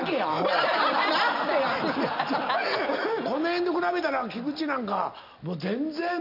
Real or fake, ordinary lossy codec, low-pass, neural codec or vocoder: real; none; 5.4 kHz; none